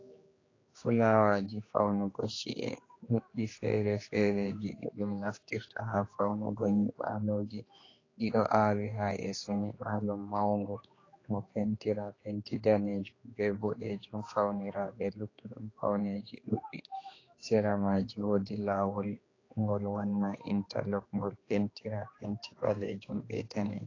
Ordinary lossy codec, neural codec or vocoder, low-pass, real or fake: AAC, 32 kbps; codec, 16 kHz, 2 kbps, X-Codec, HuBERT features, trained on general audio; 7.2 kHz; fake